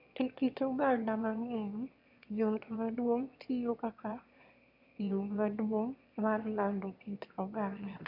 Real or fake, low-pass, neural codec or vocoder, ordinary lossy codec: fake; 5.4 kHz; autoencoder, 22.05 kHz, a latent of 192 numbers a frame, VITS, trained on one speaker; none